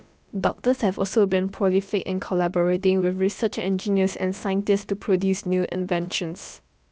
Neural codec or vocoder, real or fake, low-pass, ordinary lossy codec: codec, 16 kHz, about 1 kbps, DyCAST, with the encoder's durations; fake; none; none